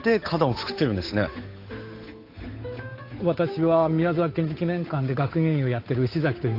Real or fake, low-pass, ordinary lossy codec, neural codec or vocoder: fake; 5.4 kHz; AAC, 32 kbps; codec, 16 kHz, 8 kbps, FunCodec, trained on Chinese and English, 25 frames a second